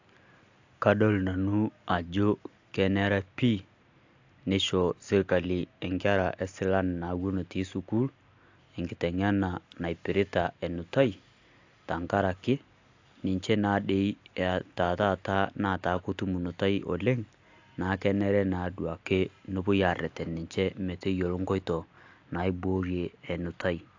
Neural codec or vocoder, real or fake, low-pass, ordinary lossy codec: none; real; 7.2 kHz; AAC, 48 kbps